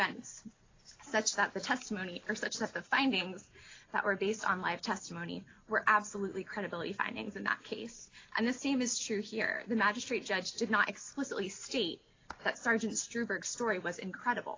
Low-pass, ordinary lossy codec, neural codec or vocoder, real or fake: 7.2 kHz; AAC, 32 kbps; none; real